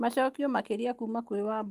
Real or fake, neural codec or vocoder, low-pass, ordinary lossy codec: real; none; 14.4 kHz; Opus, 24 kbps